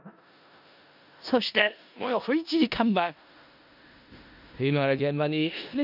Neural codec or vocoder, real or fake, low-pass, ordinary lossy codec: codec, 16 kHz in and 24 kHz out, 0.4 kbps, LongCat-Audio-Codec, four codebook decoder; fake; 5.4 kHz; none